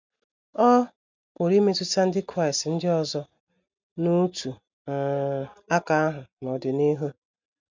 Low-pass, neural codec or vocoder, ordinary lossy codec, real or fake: 7.2 kHz; none; MP3, 64 kbps; real